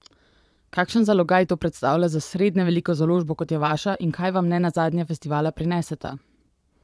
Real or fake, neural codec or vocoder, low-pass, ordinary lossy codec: fake; vocoder, 22.05 kHz, 80 mel bands, Vocos; none; none